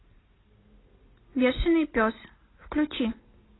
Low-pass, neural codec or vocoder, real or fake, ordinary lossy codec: 7.2 kHz; none; real; AAC, 16 kbps